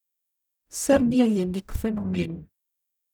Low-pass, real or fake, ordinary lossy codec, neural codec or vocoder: none; fake; none; codec, 44.1 kHz, 0.9 kbps, DAC